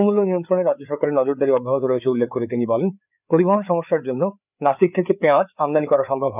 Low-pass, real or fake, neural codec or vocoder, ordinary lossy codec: 3.6 kHz; fake; codec, 16 kHz, 4 kbps, FreqCodec, larger model; none